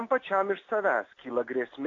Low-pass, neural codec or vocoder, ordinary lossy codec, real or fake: 7.2 kHz; none; AAC, 32 kbps; real